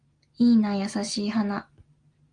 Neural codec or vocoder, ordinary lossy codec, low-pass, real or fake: none; Opus, 24 kbps; 9.9 kHz; real